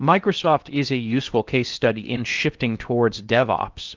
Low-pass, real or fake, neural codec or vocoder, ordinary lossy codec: 7.2 kHz; fake; codec, 16 kHz in and 24 kHz out, 0.8 kbps, FocalCodec, streaming, 65536 codes; Opus, 24 kbps